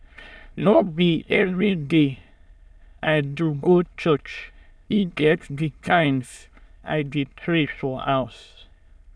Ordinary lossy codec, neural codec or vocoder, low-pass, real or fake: none; autoencoder, 22.05 kHz, a latent of 192 numbers a frame, VITS, trained on many speakers; none; fake